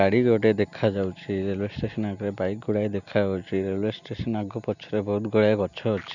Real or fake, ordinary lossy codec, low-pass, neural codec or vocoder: real; none; 7.2 kHz; none